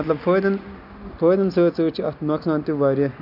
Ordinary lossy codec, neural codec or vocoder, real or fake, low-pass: none; none; real; 5.4 kHz